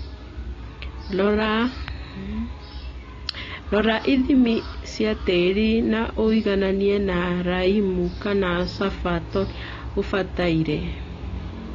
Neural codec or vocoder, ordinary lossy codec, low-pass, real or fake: none; AAC, 32 kbps; 7.2 kHz; real